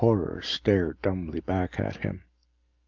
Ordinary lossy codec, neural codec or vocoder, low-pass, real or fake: Opus, 32 kbps; none; 7.2 kHz; real